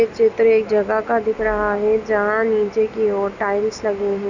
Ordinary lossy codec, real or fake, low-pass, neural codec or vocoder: none; real; 7.2 kHz; none